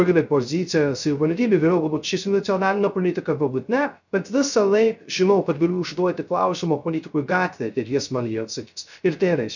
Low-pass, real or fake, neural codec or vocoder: 7.2 kHz; fake; codec, 16 kHz, 0.3 kbps, FocalCodec